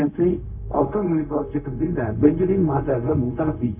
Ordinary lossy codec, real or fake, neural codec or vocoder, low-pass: AAC, 32 kbps; fake; codec, 16 kHz, 0.4 kbps, LongCat-Audio-Codec; 3.6 kHz